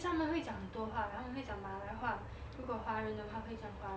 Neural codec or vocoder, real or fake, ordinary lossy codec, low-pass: none; real; none; none